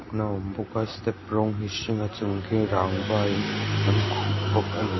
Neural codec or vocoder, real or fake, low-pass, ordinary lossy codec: none; real; 7.2 kHz; MP3, 24 kbps